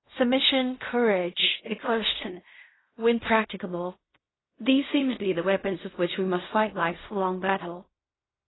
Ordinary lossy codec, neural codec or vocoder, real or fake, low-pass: AAC, 16 kbps; codec, 16 kHz in and 24 kHz out, 0.4 kbps, LongCat-Audio-Codec, fine tuned four codebook decoder; fake; 7.2 kHz